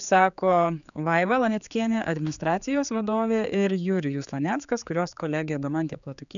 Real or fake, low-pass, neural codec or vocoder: fake; 7.2 kHz; codec, 16 kHz, 4 kbps, X-Codec, HuBERT features, trained on general audio